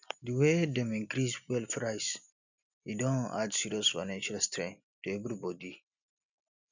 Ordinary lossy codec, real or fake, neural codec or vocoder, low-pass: none; real; none; 7.2 kHz